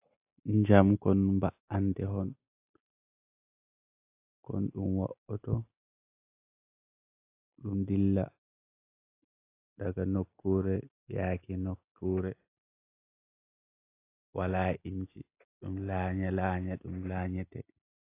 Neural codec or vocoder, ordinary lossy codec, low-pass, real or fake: none; AAC, 24 kbps; 3.6 kHz; real